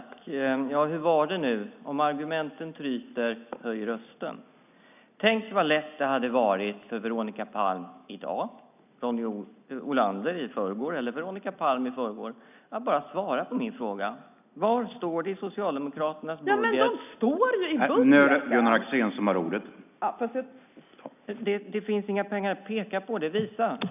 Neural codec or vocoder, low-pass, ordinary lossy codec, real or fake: none; 3.6 kHz; none; real